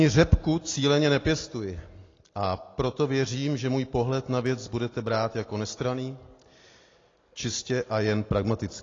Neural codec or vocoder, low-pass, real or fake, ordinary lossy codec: none; 7.2 kHz; real; AAC, 32 kbps